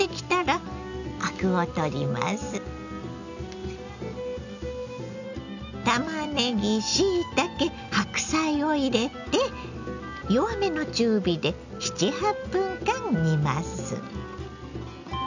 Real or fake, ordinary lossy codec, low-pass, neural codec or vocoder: real; none; 7.2 kHz; none